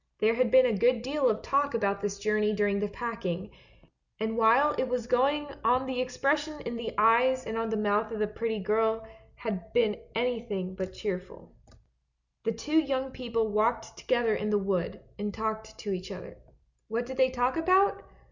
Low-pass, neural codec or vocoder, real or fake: 7.2 kHz; none; real